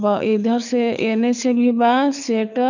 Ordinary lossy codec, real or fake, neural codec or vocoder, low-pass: none; fake; codec, 24 kHz, 6 kbps, HILCodec; 7.2 kHz